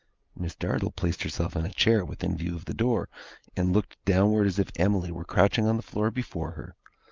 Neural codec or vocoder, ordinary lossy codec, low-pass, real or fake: none; Opus, 24 kbps; 7.2 kHz; real